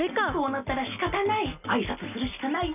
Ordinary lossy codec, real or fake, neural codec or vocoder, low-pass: none; real; none; 3.6 kHz